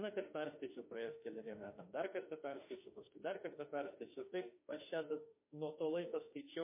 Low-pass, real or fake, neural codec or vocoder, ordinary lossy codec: 3.6 kHz; fake; autoencoder, 48 kHz, 32 numbers a frame, DAC-VAE, trained on Japanese speech; MP3, 24 kbps